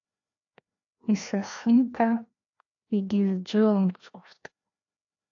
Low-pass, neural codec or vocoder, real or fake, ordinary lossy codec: 7.2 kHz; codec, 16 kHz, 1 kbps, FreqCodec, larger model; fake; AAC, 48 kbps